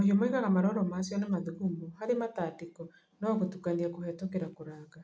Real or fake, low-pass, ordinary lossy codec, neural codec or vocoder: real; none; none; none